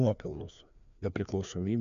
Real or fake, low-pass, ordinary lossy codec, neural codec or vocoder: fake; 7.2 kHz; AAC, 96 kbps; codec, 16 kHz, 2 kbps, FreqCodec, larger model